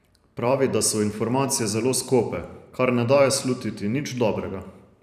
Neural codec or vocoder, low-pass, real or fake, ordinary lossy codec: vocoder, 44.1 kHz, 128 mel bands every 512 samples, BigVGAN v2; 14.4 kHz; fake; none